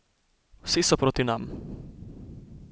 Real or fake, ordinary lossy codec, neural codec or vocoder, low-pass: real; none; none; none